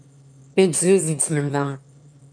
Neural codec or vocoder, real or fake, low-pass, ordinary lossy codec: autoencoder, 22.05 kHz, a latent of 192 numbers a frame, VITS, trained on one speaker; fake; 9.9 kHz; MP3, 96 kbps